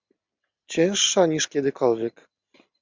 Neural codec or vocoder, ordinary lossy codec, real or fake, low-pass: none; MP3, 64 kbps; real; 7.2 kHz